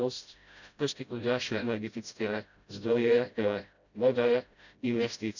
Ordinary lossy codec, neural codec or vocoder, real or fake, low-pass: none; codec, 16 kHz, 0.5 kbps, FreqCodec, smaller model; fake; 7.2 kHz